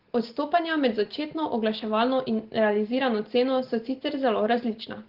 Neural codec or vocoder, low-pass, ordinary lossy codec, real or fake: none; 5.4 kHz; Opus, 16 kbps; real